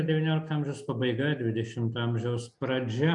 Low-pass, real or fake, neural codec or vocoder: 10.8 kHz; real; none